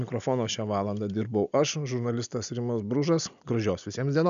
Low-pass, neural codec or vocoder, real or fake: 7.2 kHz; none; real